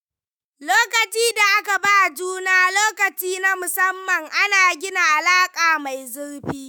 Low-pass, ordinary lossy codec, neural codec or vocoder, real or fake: none; none; autoencoder, 48 kHz, 128 numbers a frame, DAC-VAE, trained on Japanese speech; fake